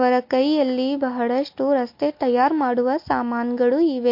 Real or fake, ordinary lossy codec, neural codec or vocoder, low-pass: real; MP3, 32 kbps; none; 5.4 kHz